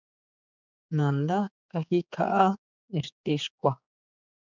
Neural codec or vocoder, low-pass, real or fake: codec, 16 kHz, 4 kbps, X-Codec, HuBERT features, trained on general audio; 7.2 kHz; fake